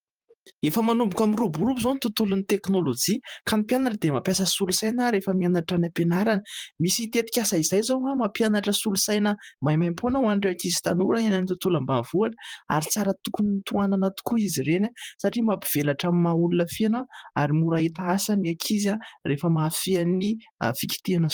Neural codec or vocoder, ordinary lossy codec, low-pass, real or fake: vocoder, 44.1 kHz, 128 mel bands, Pupu-Vocoder; Opus, 32 kbps; 19.8 kHz; fake